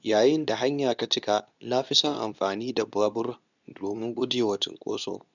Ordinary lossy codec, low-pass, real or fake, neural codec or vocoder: none; 7.2 kHz; fake; codec, 24 kHz, 0.9 kbps, WavTokenizer, medium speech release version 2